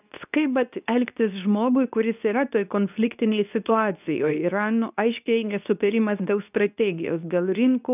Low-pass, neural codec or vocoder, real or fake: 3.6 kHz; codec, 24 kHz, 0.9 kbps, WavTokenizer, medium speech release version 2; fake